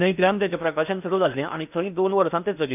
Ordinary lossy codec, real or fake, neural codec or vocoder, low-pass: none; fake; codec, 16 kHz in and 24 kHz out, 0.8 kbps, FocalCodec, streaming, 65536 codes; 3.6 kHz